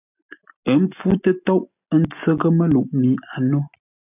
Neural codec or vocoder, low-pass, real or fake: none; 3.6 kHz; real